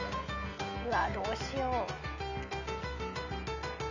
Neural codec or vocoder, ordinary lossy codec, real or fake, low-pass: none; none; real; 7.2 kHz